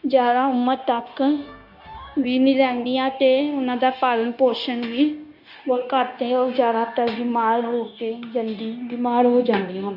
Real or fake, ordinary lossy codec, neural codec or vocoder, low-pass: fake; none; codec, 16 kHz, 0.9 kbps, LongCat-Audio-Codec; 5.4 kHz